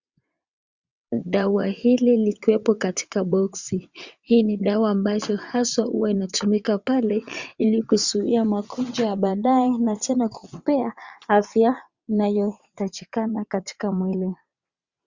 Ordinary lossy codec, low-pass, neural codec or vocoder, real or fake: Opus, 64 kbps; 7.2 kHz; vocoder, 22.05 kHz, 80 mel bands, WaveNeXt; fake